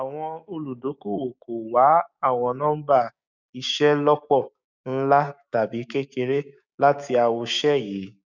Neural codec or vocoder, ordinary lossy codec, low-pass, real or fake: codec, 16 kHz, 6 kbps, DAC; none; 7.2 kHz; fake